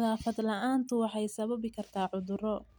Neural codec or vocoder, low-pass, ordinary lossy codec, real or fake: none; none; none; real